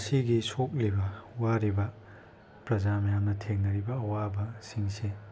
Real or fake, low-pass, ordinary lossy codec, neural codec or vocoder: real; none; none; none